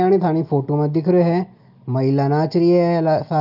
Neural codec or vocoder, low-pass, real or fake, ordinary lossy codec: none; 5.4 kHz; real; Opus, 24 kbps